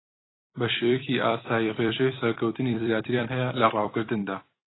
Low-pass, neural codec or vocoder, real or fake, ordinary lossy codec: 7.2 kHz; vocoder, 44.1 kHz, 128 mel bands every 256 samples, BigVGAN v2; fake; AAC, 16 kbps